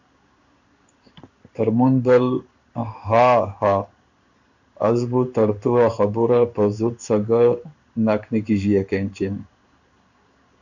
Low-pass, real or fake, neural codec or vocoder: 7.2 kHz; fake; codec, 16 kHz in and 24 kHz out, 1 kbps, XY-Tokenizer